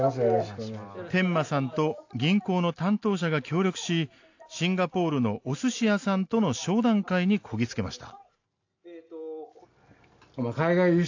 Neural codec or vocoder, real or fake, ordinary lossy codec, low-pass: none; real; AAC, 48 kbps; 7.2 kHz